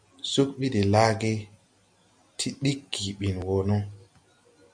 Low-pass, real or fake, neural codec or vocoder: 9.9 kHz; real; none